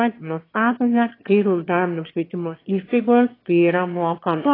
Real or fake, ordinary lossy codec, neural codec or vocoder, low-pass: fake; AAC, 24 kbps; autoencoder, 22.05 kHz, a latent of 192 numbers a frame, VITS, trained on one speaker; 5.4 kHz